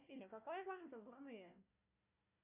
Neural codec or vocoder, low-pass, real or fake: codec, 16 kHz, 1 kbps, FunCodec, trained on Chinese and English, 50 frames a second; 3.6 kHz; fake